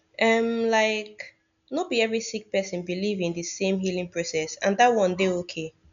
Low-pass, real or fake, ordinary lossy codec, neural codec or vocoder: 7.2 kHz; real; none; none